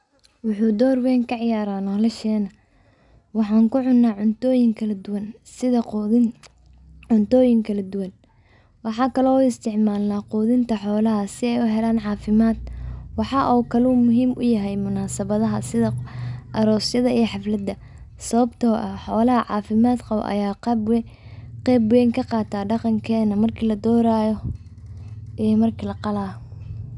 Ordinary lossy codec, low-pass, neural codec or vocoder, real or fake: none; 10.8 kHz; none; real